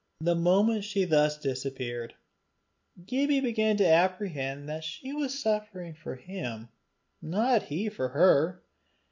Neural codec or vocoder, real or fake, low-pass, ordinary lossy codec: none; real; 7.2 kHz; MP3, 48 kbps